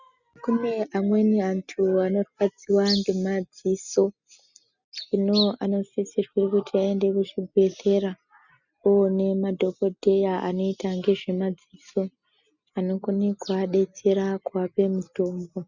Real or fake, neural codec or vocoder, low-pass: real; none; 7.2 kHz